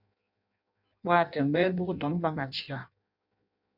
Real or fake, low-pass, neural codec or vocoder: fake; 5.4 kHz; codec, 16 kHz in and 24 kHz out, 0.6 kbps, FireRedTTS-2 codec